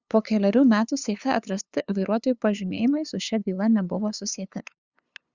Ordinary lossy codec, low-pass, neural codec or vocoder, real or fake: Opus, 64 kbps; 7.2 kHz; codec, 16 kHz, 2 kbps, FunCodec, trained on LibriTTS, 25 frames a second; fake